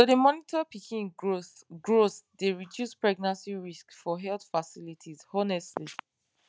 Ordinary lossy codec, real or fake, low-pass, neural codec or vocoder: none; real; none; none